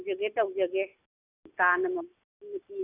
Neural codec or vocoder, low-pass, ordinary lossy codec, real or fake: none; 3.6 kHz; none; real